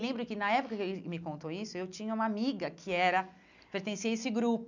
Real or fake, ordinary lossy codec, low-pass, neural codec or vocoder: real; none; 7.2 kHz; none